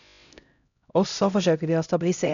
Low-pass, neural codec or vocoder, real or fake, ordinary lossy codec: 7.2 kHz; codec, 16 kHz, 0.5 kbps, X-Codec, HuBERT features, trained on LibriSpeech; fake; none